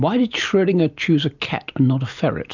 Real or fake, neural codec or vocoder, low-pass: real; none; 7.2 kHz